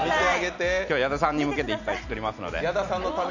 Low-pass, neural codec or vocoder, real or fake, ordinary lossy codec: 7.2 kHz; none; real; none